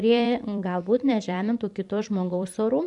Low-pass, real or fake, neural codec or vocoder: 10.8 kHz; fake; vocoder, 44.1 kHz, 128 mel bands every 256 samples, BigVGAN v2